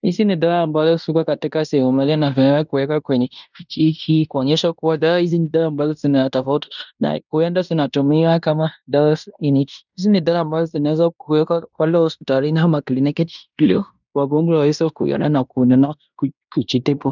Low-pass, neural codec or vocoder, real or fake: 7.2 kHz; codec, 16 kHz in and 24 kHz out, 0.9 kbps, LongCat-Audio-Codec, fine tuned four codebook decoder; fake